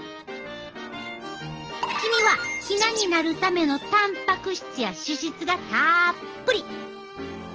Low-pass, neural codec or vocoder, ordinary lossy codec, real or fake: 7.2 kHz; none; Opus, 16 kbps; real